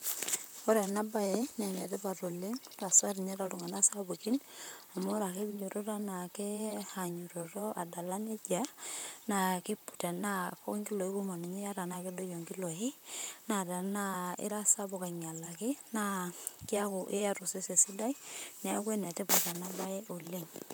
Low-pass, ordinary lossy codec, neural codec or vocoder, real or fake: none; none; vocoder, 44.1 kHz, 128 mel bands, Pupu-Vocoder; fake